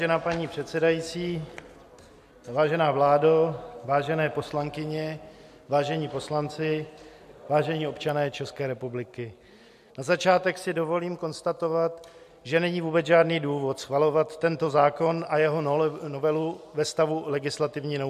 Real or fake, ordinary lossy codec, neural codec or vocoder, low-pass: real; MP3, 64 kbps; none; 14.4 kHz